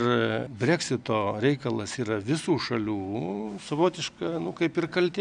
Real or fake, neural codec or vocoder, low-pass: real; none; 9.9 kHz